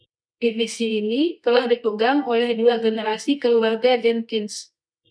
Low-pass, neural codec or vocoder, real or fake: 9.9 kHz; codec, 24 kHz, 0.9 kbps, WavTokenizer, medium music audio release; fake